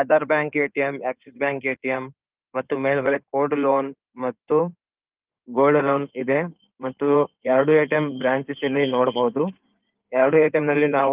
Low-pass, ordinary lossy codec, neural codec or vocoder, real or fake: 3.6 kHz; Opus, 32 kbps; codec, 16 kHz in and 24 kHz out, 2.2 kbps, FireRedTTS-2 codec; fake